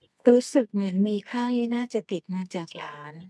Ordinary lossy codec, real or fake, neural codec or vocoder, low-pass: none; fake; codec, 24 kHz, 0.9 kbps, WavTokenizer, medium music audio release; none